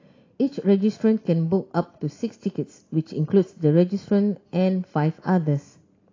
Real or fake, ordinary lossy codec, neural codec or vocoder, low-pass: real; AAC, 32 kbps; none; 7.2 kHz